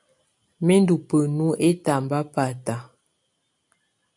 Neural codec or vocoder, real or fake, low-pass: none; real; 10.8 kHz